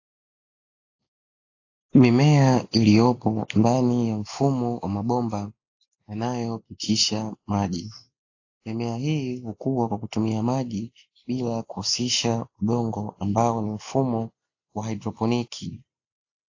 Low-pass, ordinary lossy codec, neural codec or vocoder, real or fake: 7.2 kHz; AAC, 48 kbps; none; real